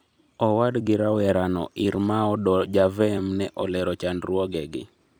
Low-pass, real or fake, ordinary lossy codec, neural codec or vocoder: none; fake; none; vocoder, 44.1 kHz, 128 mel bands every 256 samples, BigVGAN v2